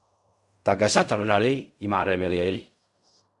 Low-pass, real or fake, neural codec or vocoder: 10.8 kHz; fake; codec, 16 kHz in and 24 kHz out, 0.4 kbps, LongCat-Audio-Codec, fine tuned four codebook decoder